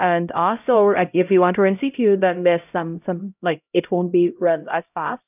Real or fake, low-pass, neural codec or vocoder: fake; 3.6 kHz; codec, 16 kHz, 0.5 kbps, X-Codec, HuBERT features, trained on LibriSpeech